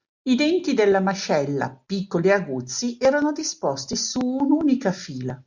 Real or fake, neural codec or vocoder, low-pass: real; none; 7.2 kHz